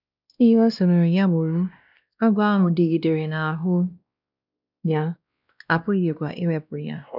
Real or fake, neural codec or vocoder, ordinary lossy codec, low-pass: fake; codec, 16 kHz, 1 kbps, X-Codec, WavLM features, trained on Multilingual LibriSpeech; none; 5.4 kHz